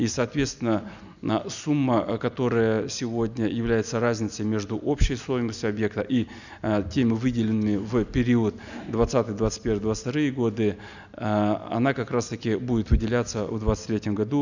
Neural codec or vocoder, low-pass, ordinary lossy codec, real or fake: none; 7.2 kHz; none; real